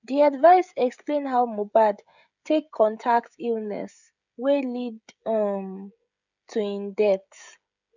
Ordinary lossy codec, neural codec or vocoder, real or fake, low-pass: none; codec, 16 kHz, 16 kbps, FreqCodec, smaller model; fake; 7.2 kHz